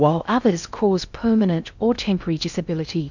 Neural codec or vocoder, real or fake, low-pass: codec, 16 kHz in and 24 kHz out, 0.6 kbps, FocalCodec, streaming, 4096 codes; fake; 7.2 kHz